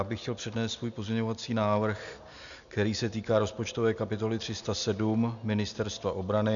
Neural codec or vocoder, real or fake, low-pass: none; real; 7.2 kHz